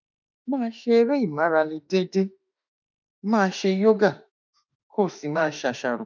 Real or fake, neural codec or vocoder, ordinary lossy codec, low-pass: fake; autoencoder, 48 kHz, 32 numbers a frame, DAC-VAE, trained on Japanese speech; none; 7.2 kHz